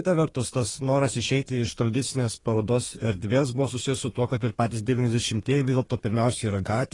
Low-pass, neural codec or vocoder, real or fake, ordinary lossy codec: 10.8 kHz; codec, 32 kHz, 1.9 kbps, SNAC; fake; AAC, 32 kbps